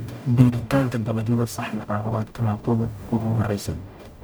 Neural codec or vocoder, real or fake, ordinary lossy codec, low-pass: codec, 44.1 kHz, 0.9 kbps, DAC; fake; none; none